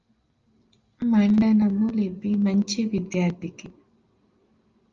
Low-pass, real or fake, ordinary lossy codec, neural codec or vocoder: 7.2 kHz; real; Opus, 32 kbps; none